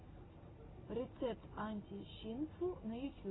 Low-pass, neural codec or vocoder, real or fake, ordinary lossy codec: 7.2 kHz; none; real; AAC, 16 kbps